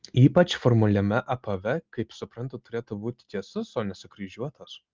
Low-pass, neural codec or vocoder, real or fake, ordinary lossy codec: 7.2 kHz; none; real; Opus, 32 kbps